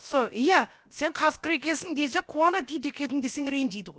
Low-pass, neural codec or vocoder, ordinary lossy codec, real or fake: none; codec, 16 kHz, about 1 kbps, DyCAST, with the encoder's durations; none; fake